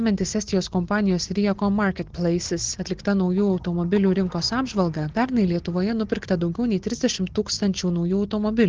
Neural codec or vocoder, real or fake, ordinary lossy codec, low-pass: none; real; Opus, 16 kbps; 7.2 kHz